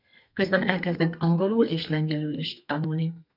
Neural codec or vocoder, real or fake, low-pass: codec, 32 kHz, 1.9 kbps, SNAC; fake; 5.4 kHz